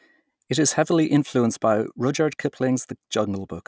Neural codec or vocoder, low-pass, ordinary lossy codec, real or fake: none; none; none; real